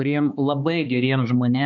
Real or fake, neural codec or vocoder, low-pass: fake; codec, 16 kHz, 2 kbps, X-Codec, HuBERT features, trained on balanced general audio; 7.2 kHz